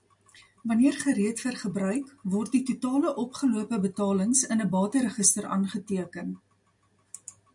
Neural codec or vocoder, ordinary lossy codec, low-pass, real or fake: none; MP3, 96 kbps; 10.8 kHz; real